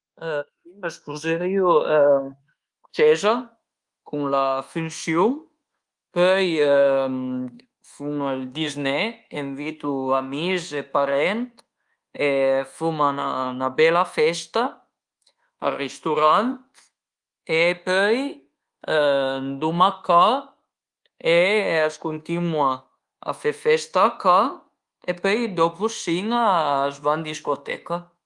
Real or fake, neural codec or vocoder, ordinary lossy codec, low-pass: fake; codec, 24 kHz, 1.2 kbps, DualCodec; Opus, 24 kbps; 10.8 kHz